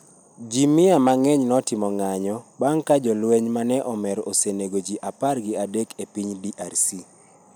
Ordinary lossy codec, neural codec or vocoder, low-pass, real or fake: none; none; none; real